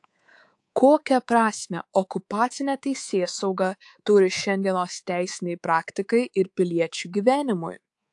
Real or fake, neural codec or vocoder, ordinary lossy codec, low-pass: fake; codec, 24 kHz, 3.1 kbps, DualCodec; AAC, 64 kbps; 10.8 kHz